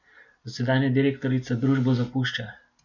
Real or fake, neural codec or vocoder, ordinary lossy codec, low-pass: real; none; none; 7.2 kHz